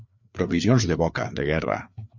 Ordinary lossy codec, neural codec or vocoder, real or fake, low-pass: MP3, 64 kbps; codec, 16 kHz, 4 kbps, FreqCodec, larger model; fake; 7.2 kHz